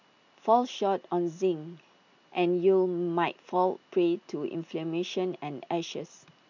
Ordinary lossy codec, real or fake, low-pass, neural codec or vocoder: none; real; 7.2 kHz; none